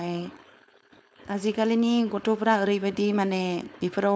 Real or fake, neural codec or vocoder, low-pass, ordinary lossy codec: fake; codec, 16 kHz, 4.8 kbps, FACodec; none; none